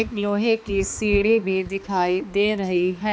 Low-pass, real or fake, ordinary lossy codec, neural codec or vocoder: none; fake; none; codec, 16 kHz, 2 kbps, X-Codec, HuBERT features, trained on balanced general audio